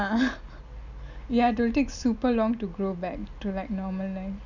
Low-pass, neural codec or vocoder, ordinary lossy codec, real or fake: 7.2 kHz; none; none; real